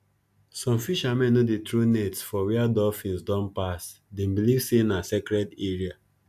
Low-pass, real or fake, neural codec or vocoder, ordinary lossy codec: 14.4 kHz; real; none; none